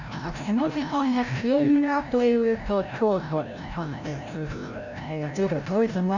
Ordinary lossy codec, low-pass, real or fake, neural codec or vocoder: none; 7.2 kHz; fake; codec, 16 kHz, 0.5 kbps, FreqCodec, larger model